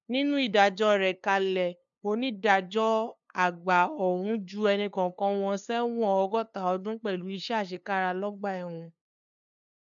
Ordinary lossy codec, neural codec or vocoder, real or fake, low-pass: MP3, 64 kbps; codec, 16 kHz, 2 kbps, FunCodec, trained on LibriTTS, 25 frames a second; fake; 7.2 kHz